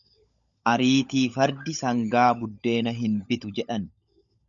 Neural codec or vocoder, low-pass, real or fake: codec, 16 kHz, 16 kbps, FunCodec, trained on LibriTTS, 50 frames a second; 7.2 kHz; fake